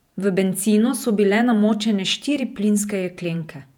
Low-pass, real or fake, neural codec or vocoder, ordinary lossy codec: 19.8 kHz; fake; vocoder, 48 kHz, 128 mel bands, Vocos; none